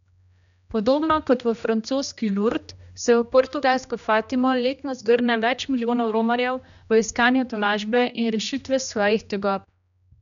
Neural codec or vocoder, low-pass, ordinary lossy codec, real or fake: codec, 16 kHz, 1 kbps, X-Codec, HuBERT features, trained on general audio; 7.2 kHz; none; fake